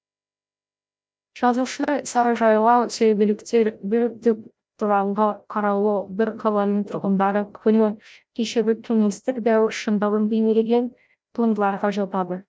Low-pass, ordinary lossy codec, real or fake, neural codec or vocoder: none; none; fake; codec, 16 kHz, 0.5 kbps, FreqCodec, larger model